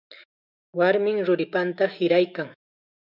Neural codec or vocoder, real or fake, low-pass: autoencoder, 48 kHz, 128 numbers a frame, DAC-VAE, trained on Japanese speech; fake; 5.4 kHz